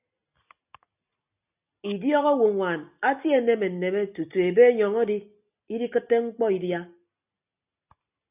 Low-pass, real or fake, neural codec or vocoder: 3.6 kHz; real; none